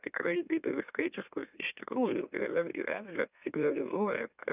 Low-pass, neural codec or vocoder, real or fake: 3.6 kHz; autoencoder, 44.1 kHz, a latent of 192 numbers a frame, MeloTTS; fake